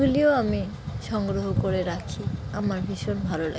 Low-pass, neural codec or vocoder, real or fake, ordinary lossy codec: none; none; real; none